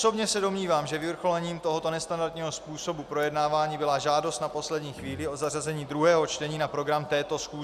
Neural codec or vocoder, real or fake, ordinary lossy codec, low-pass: none; real; Opus, 64 kbps; 14.4 kHz